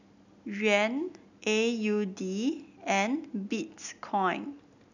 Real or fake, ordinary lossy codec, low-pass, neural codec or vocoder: real; none; 7.2 kHz; none